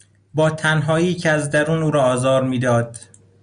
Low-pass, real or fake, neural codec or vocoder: 9.9 kHz; real; none